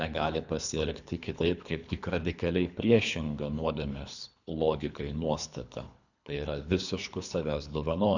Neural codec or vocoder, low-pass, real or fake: codec, 24 kHz, 3 kbps, HILCodec; 7.2 kHz; fake